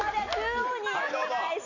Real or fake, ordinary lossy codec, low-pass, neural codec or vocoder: real; none; 7.2 kHz; none